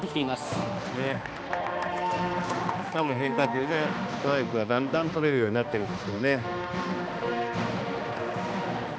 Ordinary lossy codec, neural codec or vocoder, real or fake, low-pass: none; codec, 16 kHz, 2 kbps, X-Codec, HuBERT features, trained on balanced general audio; fake; none